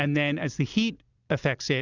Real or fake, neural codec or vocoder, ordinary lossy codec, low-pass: real; none; Opus, 64 kbps; 7.2 kHz